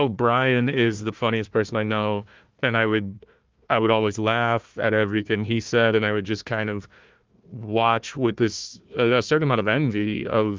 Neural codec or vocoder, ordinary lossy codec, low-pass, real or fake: codec, 16 kHz, 1 kbps, FunCodec, trained on Chinese and English, 50 frames a second; Opus, 24 kbps; 7.2 kHz; fake